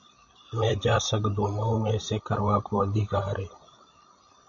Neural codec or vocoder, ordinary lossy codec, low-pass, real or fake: codec, 16 kHz, 16 kbps, FreqCodec, larger model; MP3, 64 kbps; 7.2 kHz; fake